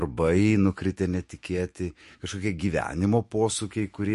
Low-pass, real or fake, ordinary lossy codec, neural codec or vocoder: 14.4 kHz; fake; MP3, 48 kbps; autoencoder, 48 kHz, 128 numbers a frame, DAC-VAE, trained on Japanese speech